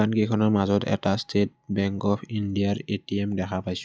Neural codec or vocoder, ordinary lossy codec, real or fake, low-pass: none; none; real; none